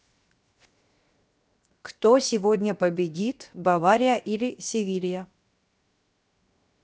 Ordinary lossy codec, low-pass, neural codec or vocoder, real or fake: none; none; codec, 16 kHz, 0.7 kbps, FocalCodec; fake